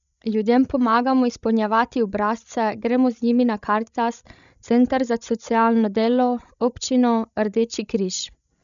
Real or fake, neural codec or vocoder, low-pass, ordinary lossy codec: fake; codec, 16 kHz, 16 kbps, FreqCodec, larger model; 7.2 kHz; none